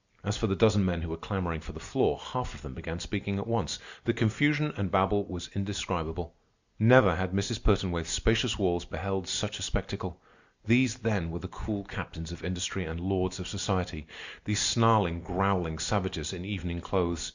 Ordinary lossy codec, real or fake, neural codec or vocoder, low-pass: Opus, 64 kbps; real; none; 7.2 kHz